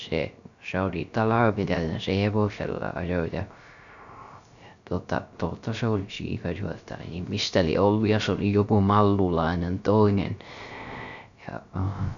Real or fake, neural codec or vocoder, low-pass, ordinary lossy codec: fake; codec, 16 kHz, 0.3 kbps, FocalCodec; 7.2 kHz; none